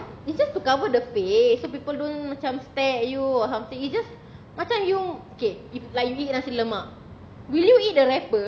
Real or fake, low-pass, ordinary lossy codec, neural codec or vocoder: real; none; none; none